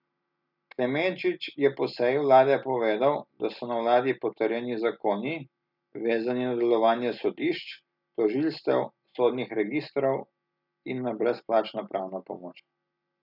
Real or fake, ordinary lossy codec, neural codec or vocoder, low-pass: real; none; none; 5.4 kHz